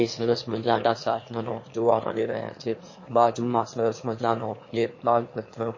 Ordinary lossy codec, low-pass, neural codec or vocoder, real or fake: MP3, 32 kbps; 7.2 kHz; autoencoder, 22.05 kHz, a latent of 192 numbers a frame, VITS, trained on one speaker; fake